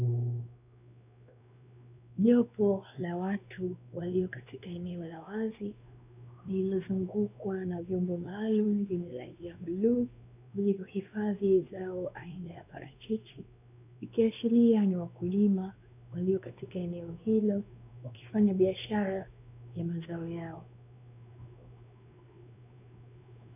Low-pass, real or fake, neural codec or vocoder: 3.6 kHz; fake; codec, 16 kHz, 2 kbps, X-Codec, WavLM features, trained on Multilingual LibriSpeech